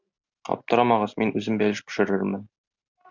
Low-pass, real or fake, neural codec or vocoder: 7.2 kHz; real; none